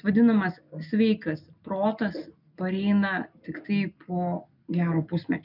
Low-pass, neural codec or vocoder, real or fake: 5.4 kHz; none; real